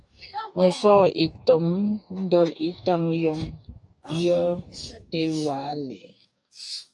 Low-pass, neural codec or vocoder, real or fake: 10.8 kHz; codec, 44.1 kHz, 2.6 kbps, DAC; fake